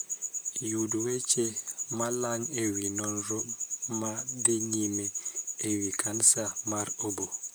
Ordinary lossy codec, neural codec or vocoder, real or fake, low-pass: none; vocoder, 44.1 kHz, 128 mel bands, Pupu-Vocoder; fake; none